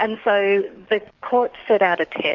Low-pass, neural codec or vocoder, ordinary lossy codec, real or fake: 7.2 kHz; codec, 44.1 kHz, 7.8 kbps, DAC; Opus, 64 kbps; fake